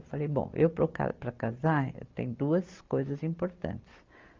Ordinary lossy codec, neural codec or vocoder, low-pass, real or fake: Opus, 24 kbps; none; 7.2 kHz; real